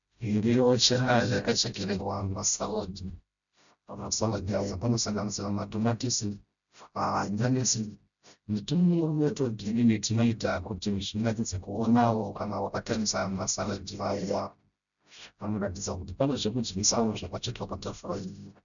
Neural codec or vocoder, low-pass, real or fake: codec, 16 kHz, 0.5 kbps, FreqCodec, smaller model; 7.2 kHz; fake